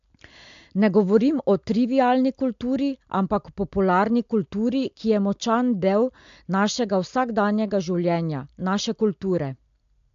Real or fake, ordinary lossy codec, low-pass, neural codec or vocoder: real; AAC, 64 kbps; 7.2 kHz; none